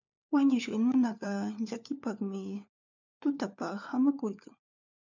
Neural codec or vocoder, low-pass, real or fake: codec, 16 kHz, 4 kbps, FunCodec, trained on LibriTTS, 50 frames a second; 7.2 kHz; fake